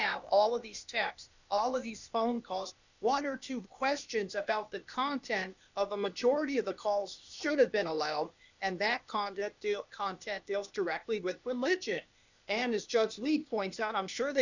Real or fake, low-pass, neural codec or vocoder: fake; 7.2 kHz; codec, 16 kHz, 0.8 kbps, ZipCodec